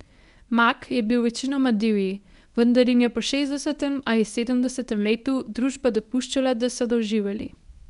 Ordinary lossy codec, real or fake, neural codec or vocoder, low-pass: none; fake; codec, 24 kHz, 0.9 kbps, WavTokenizer, medium speech release version 1; 10.8 kHz